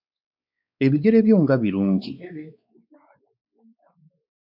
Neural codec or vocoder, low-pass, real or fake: codec, 16 kHz, 4 kbps, X-Codec, WavLM features, trained on Multilingual LibriSpeech; 5.4 kHz; fake